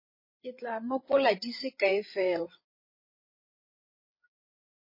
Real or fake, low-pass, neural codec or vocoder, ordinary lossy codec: fake; 5.4 kHz; codec, 16 kHz, 8 kbps, FreqCodec, larger model; MP3, 24 kbps